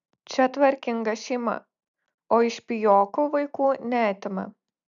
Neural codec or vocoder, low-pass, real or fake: none; 7.2 kHz; real